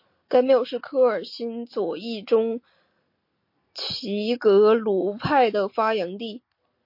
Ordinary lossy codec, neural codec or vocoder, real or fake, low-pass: MP3, 32 kbps; none; real; 5.4 kHz